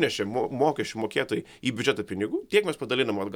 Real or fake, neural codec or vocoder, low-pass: real; none; 19.8 kHz